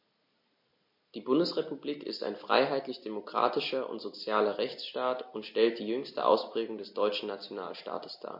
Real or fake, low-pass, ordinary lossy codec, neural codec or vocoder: real; 5.4 kHz; MP3, 32 kbps; none